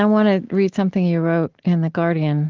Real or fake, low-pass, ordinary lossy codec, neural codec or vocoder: real; 7.2 kHz; Opus, 16 kbps; none